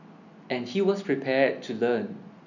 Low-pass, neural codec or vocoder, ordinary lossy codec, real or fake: 7.2 kHz; none; none; real